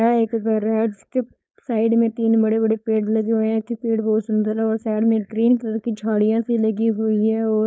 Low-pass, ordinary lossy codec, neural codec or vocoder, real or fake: none; none; codec, 16 kHz, 4.8 kbps, FACodec; fake